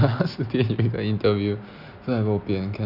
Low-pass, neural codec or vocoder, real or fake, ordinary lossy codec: 5.4 kHz; none; real; none